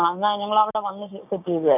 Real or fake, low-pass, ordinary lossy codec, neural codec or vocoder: fake; 3.6 kHz; none; codec, 16 kHz, 6 kbps, DAC